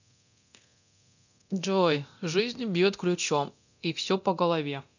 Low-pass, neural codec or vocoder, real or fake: 7.2 kHz; codec, 24 kHz, 0.9 kbps, DualCodec; fake